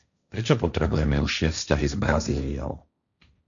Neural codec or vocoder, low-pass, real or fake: codec, 16 kHz, 1.1 kbps, Voila-Tokenizer; 7.2 kHz; fake